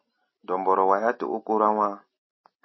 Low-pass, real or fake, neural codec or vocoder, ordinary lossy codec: 7.2 kHz; real; none; MP3, 24 kbps